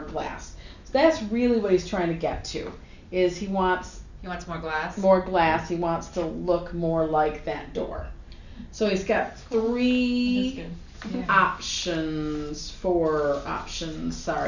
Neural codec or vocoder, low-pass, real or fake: none; 7.2 kHz; real